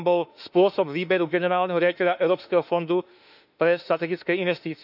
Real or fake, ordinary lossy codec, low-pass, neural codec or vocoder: fake; none; 5.4 kHz; autoencoder, 48 kHz, 32 numbers a frame, DAC-VAE, trained on Japanese speech